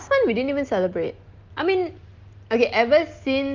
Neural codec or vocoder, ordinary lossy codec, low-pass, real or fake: none; Opus, 24 kbps; 7.2 kHz; real